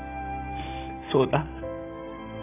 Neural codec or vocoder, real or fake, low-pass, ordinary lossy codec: none; real; 3.6 kHz; AAC, 32 kbps